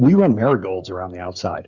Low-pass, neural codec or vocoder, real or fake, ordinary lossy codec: 7.2 kHz; codec, 16 kHz, 8 kbps, FreqCodec, smaller model; fake; Opus, 64 kbps